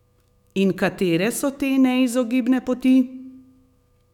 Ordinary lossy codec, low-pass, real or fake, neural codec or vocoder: none; 19.8 kHz; fake; autoencoder, 48 kHz, 128 numbers a frame, DAC-VAE, trained on Japanese speech